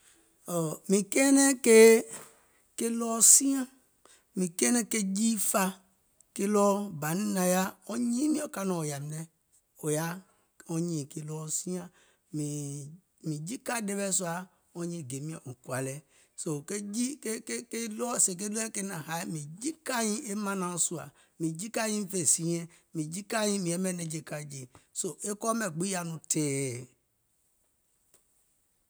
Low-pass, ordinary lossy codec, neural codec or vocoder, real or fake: none; none; none; real